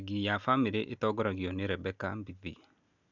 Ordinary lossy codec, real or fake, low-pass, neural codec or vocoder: none; real; 7.2 kHz; none